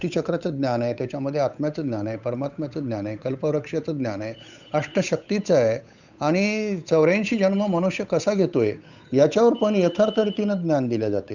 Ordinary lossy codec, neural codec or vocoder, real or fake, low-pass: none; codec, 16 kHz, 8 kbps, FunCodec, trained on Chinese and English, 25 frames a second; fake; 7.2 kHz